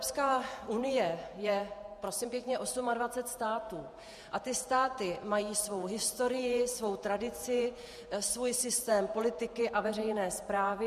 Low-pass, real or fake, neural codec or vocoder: 14.4 kHz; fake; vocoder, 48 kHz, 128 mel bands, Vocos